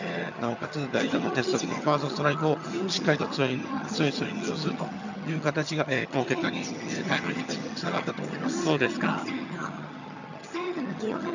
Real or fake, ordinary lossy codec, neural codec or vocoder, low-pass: fake; none; vocoder, 22.05 kHz, 80 mel bands, HiFi-GAN; 7.2 kHz